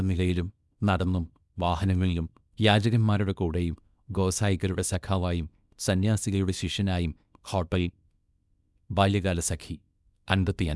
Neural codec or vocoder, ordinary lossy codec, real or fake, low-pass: codec, 24 kHz, 0.9 kbps, WavTokenizer, small release; none; fake; none